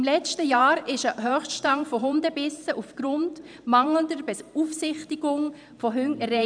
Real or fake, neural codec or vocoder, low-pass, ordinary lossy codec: fake; vocoder, 44.1 kHz, 128 mel bands every 512 samples, BigVGAN v2; 9.9 kHz; none